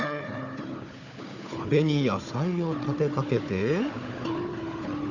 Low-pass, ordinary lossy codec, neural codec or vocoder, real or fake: 7.2 kHz; none; codec, 16 kHz, 4 kbps, FunCodec, trained on Chinese and English, 50 frames a second; fake